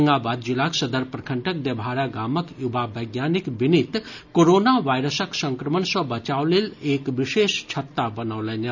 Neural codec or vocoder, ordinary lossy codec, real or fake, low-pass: none; none; real; 7.2 kHz